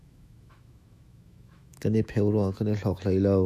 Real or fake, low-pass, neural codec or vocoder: fake; 14.4 kHz; autoencoder, 48 kHz, 128 numbers a frame, DAC-VAE, trained on Japanese speech